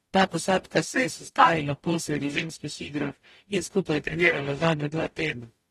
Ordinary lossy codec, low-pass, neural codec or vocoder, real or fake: AAC, 32 kbps; 19.8 kHz; codec, 44.1 kHz, 0.9 kbps, DAC; fake